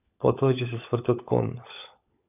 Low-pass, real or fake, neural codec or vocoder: 3.6 kHz; fake; codec, 16 kHz, 16 kbps, FunCodec, trained on LibriTTS, 50 frames a second